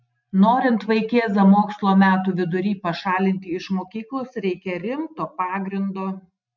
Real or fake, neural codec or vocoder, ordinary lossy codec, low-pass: real; none; MP3, 64 kbps; 7.2 kHz